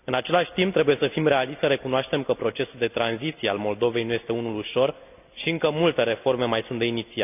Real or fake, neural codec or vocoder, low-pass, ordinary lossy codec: real; none; 3.6 kHz; none